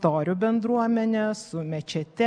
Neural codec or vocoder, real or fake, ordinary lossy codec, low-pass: none; real; MP3, 96 kbps; 9.9 kHz